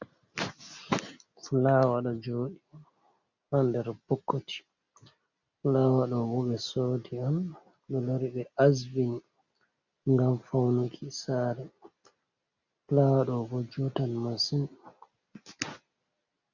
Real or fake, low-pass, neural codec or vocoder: real; 7.2 kHz; none